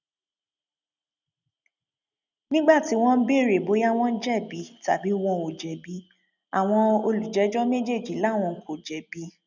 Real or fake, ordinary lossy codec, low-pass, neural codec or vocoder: real; none; 7.2 kHz; none